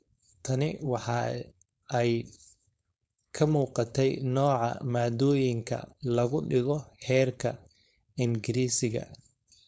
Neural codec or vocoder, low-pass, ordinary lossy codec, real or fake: codec, 16 kHz, 4.8 kbps, FACodec; none; none; fake